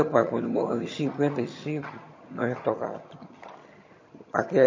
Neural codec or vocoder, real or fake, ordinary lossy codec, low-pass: vocoder, 22.05 kHz, 80 mel bands, HiFi-GAN; fake; MP3, 32 kbps; 7.2 kHz